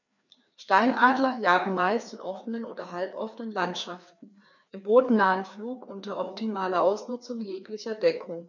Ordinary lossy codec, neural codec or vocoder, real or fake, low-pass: none; codec, 16 kHz, 2 kbps, FreqCodec, larger model; fake; 7.2 kHz